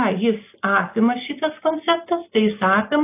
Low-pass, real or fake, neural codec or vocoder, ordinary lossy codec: 3.6 kHz; real; none; AAC, 24 kbps